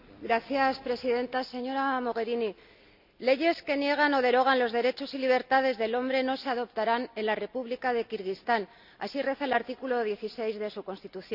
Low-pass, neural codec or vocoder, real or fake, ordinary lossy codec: 5.4 kHz; none; real; none